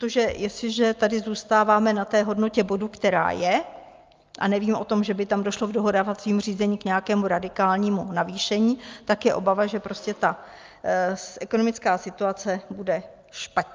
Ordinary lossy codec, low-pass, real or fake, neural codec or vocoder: Opus, 24 kbps; 7.2 kHz; real; none